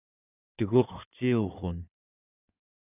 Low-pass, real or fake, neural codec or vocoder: 3.6 kHz; fake; codec, 16 kHz in and 24 kHz out, 2.2 kbps, FireRedTTS-2 codec